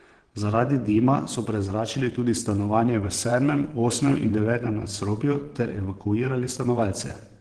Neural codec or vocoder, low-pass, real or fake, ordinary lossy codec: vocoder, 22.05 kHz, 80 mel bands, WaveNeXt; 9.9 kHz; fake; Opus, 16 kbps